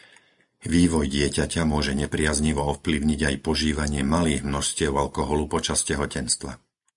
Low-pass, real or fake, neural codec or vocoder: 10.8 kHz; real; none